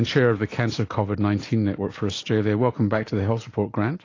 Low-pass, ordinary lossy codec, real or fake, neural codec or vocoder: 7.2 kHz; AAC, 32 kbps; real; none